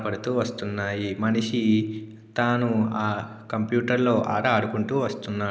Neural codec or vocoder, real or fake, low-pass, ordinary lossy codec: none; real; none; none